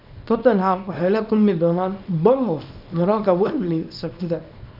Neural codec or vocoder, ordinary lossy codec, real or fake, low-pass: codec, 24 kHz, 0.9 kbps, WavTokenizer, small release; none; fake; 5.4 kHz